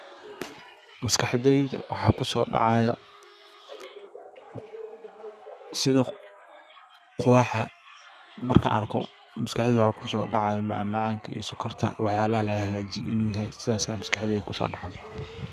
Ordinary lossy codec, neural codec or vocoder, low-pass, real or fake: none; codec, 32 kHz, 1.9 kbps, SNAC; 14.4 kHz; fake